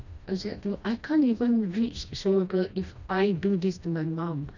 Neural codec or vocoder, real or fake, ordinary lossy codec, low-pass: codec, 16 kHz, 1 kbps, FreqCodec, smaller model; fake; none; 7.2 kHz